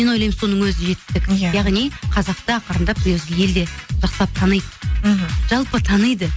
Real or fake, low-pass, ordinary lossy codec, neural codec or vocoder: real; none; none; none